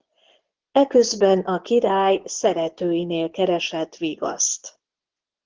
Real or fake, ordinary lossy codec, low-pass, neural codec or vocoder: fake; Opus, 16 kbps; 7.2 kHz; vocoder, 24 kHz, 100 mel bands, Vocos